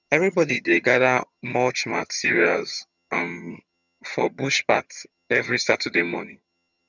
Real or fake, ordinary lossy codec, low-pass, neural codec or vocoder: fake; none; 7.2 kHz; vocoder, 22.05 kHz, 80 mel bands, HiFi-GAN